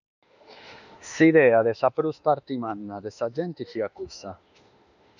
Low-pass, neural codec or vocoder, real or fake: 7.2 kHz; autoencoder, 48 kHz, 32 numbers a frame, DAC-VAE, trained on Japanese speech; fake